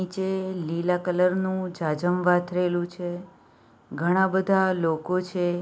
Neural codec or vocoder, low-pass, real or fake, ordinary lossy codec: none; none; real; none